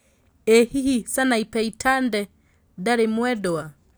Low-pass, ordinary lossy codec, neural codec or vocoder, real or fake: none; none; none; real